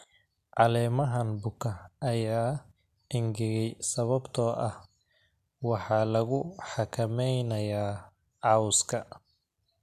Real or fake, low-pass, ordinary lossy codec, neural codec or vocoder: real; 14.4 kHz; AAC, 96 kbps; none